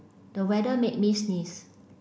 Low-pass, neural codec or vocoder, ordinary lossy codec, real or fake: none; none; none; real